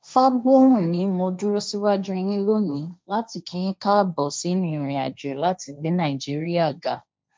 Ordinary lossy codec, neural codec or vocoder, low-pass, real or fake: none; codec, 16 kHz, 1.1 kbps, Voila-Tokenizer; none; fake